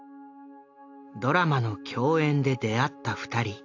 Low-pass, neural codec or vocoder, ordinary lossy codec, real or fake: 7.2 kHz; none; AAC, 48 kbps; real